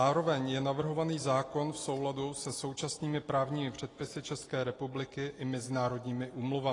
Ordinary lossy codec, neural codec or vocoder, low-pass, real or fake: AAC, 32 kbps; none; 10.8 kHz; real